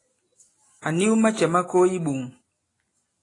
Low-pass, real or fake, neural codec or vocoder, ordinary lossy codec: 10.8 kHz; real; none; AAC, 32 kbps